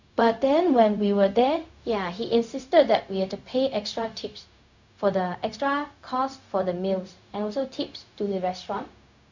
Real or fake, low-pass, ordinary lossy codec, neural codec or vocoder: fake; 7.2 kHz; none; codec, 16 kHz, 0.4 kbps, LongCat-Audio-Codec